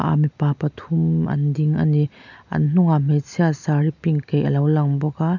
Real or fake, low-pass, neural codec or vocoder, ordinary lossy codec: real; 7.2 kHz; none; none